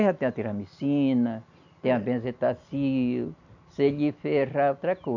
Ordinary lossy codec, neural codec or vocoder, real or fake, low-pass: none; none; real; 7.2 kHz